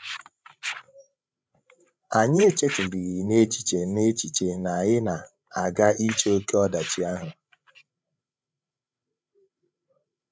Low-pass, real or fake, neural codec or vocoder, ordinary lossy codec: none; fake; codec, 16 kHz, 16 kbps, FreqCodec, larger model; none